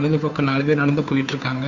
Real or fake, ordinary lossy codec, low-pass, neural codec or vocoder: fake; none; 7.2 kHz; codec, 16 kHz, 2 kbps, FunCodec, trained on Chinese and English, 25 frames a second